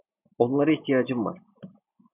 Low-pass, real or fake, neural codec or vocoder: 3.6 kHz; real; none